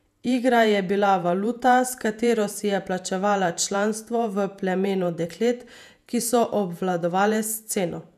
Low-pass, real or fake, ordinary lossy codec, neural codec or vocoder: 14.4 kHz; fake; none; vocoder, 48 kHz, 128 mel bands, Vocos